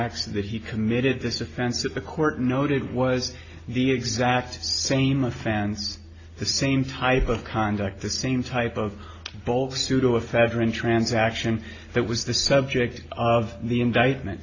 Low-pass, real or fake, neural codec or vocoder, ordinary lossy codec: 7.2 kHz; real; none; AAC, 32 kbps